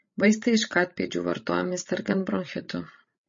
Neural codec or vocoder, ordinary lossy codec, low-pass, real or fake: codec, 16 kHz, 16 kbps, FreqCodec, larger model; MP3, 32 kbps; 7.2 kHz; fake